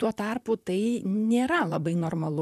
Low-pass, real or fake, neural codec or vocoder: 14.4 kHz; fake; vocoder, 44.1 kHz, 128 mel bands, Pupu-Vocoder